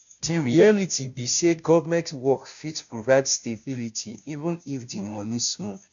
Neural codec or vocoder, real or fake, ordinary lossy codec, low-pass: codec, 16 kHz, 0.5 kbps, FunCodec, trained on Chinese and English, 25 frames a second; fake; none; 7.2 kHz